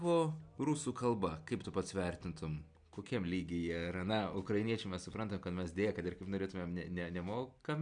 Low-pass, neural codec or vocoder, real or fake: 9.9 kHz; none; real